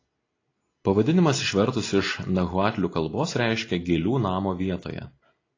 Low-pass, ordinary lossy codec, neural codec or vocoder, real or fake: 7.2 kHz; AAC, 32 kbps; none; real